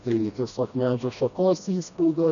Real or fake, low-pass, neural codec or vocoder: fake; 7.2 kHz; codec, 16 kHz, 1 kbps, FreqCodec, smaller model